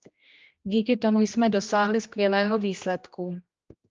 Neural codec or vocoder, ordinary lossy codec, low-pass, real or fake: codec, 16 kHz, 1 kbps, X-Codec, HuBERT features, trained on general audio; Opus, 24 kbps; 7.2 kHz; fake